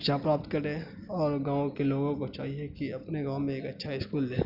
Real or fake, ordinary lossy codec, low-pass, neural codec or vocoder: real; none; 5.4 kHz; none